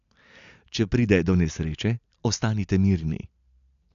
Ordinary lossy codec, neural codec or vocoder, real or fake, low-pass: none; none; real; 7.2 kHz